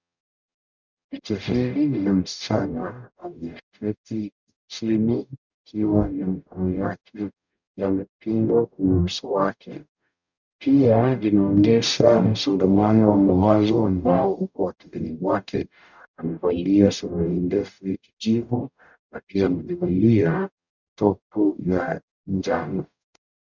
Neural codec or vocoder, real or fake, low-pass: codec, 44.1 kHz, 0.9 kbps, DAC; fake; 7.2 kHz